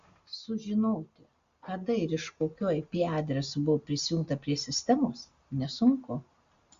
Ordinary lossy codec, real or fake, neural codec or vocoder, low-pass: Opus, 64 kbps; real; none; 7.2 kHz